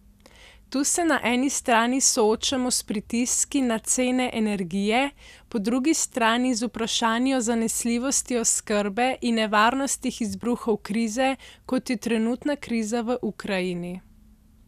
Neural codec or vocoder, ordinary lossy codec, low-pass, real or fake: none; none; 14.4 kHz; real